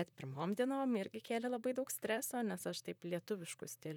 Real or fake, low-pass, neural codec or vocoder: fake; 19.8 kHz; vocoder, 44.1 kHz, 128 mel bands, Pupu-Vocoder